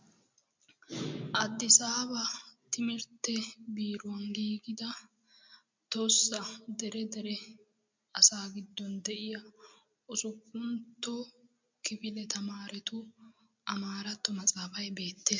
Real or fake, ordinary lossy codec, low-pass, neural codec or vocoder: real; MP3, 64 kbps; 7.2 kHz; none